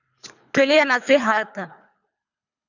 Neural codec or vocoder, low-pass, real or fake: codec, 24 kHz, 3 kbps, HILCodec; 7.2 kHz; fake